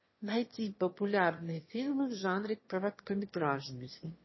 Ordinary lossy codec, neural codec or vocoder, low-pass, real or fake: MP3, 24 kbps; autoencoder, 22.05 kHz, a latent of 192 numbers a frame, VITS, trained on one speaker; 7.2 kHz; fake